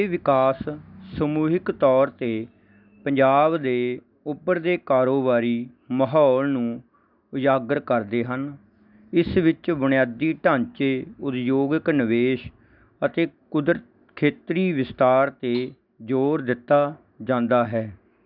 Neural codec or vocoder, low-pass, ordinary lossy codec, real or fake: none; 5.4 kHz; none; real